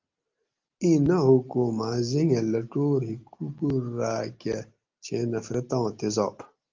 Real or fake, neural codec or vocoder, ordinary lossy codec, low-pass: real; none; Opus, 32 kbps; 7.2 kHz